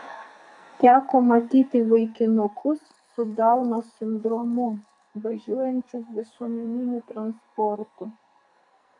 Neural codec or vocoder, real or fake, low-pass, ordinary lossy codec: codec, 44.1 kHz, 2.6 kbps, SNAC; fake; 10.8 kHz; MP3, 96 kbps